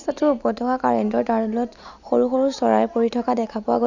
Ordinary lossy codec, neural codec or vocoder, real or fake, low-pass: none; none; real; 7.2 kHz